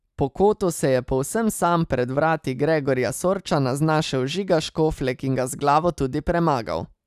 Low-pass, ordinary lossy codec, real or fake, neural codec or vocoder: 14.4 kHz; none; real; none